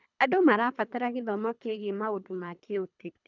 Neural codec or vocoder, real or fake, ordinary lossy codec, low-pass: codec, 24 kHz, 3 kbps, HILCodec; fake; none; 7.2 kHz